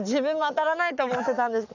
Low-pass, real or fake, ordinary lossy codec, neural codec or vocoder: 7.2 kHz; fake; none; codec, 44.1 kHz, 7.8 kbps, Pupu-Codec